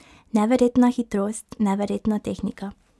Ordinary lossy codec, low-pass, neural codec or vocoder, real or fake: none; none; none; real